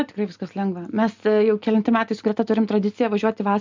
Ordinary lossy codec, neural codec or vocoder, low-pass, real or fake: MP3, 64 kbps; none; 7.2 kHz; real